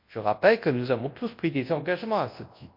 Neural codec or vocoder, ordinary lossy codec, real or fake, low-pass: codec, 24 kHz, 0.9 kbps, WavTokenizer, large speech release; MP3, 32 kbps; fake; 5.4 kHz